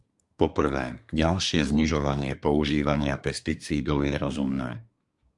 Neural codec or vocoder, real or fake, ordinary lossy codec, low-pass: codec, 24 kHz, 1 kbps, SNAC; fake; MP3, 96 kbps; 10.8 kHz